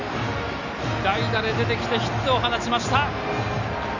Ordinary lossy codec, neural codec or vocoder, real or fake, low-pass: none; none; real; 7.2 kHz